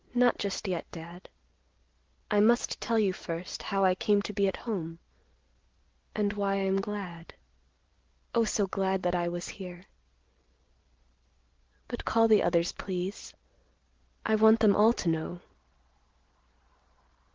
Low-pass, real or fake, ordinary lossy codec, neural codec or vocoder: 7.2 kHz; real; Opus, 16 kbps; none